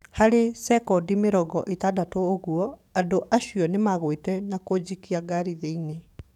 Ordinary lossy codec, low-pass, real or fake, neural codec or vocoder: none; 19.8 kHz; fake; codec, 44.1 kHz, 7.8 kbps, DAC